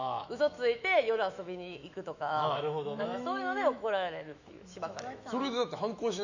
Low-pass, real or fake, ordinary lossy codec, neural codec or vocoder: 7.2 kHz; fake; none; autoencoder, 48 kHz, 128 numbers a frame, DAC-VAE, trained on Japanese speech